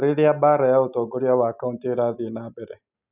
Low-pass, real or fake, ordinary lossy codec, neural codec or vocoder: 3.6 kHz; real; none; none